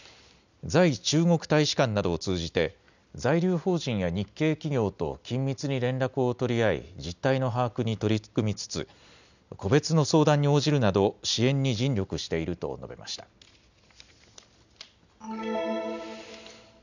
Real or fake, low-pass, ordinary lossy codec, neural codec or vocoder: real; 7.2 kHz; none; none